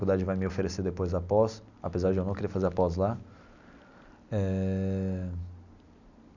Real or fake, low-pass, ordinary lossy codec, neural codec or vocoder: real; 7.2 kHz; none; none